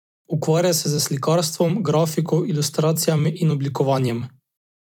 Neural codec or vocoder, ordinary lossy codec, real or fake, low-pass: vocoder, 44.1 kHz, 128 mel bands every 256 samples, BigVGAN v2; none; fake; 19.8 kHz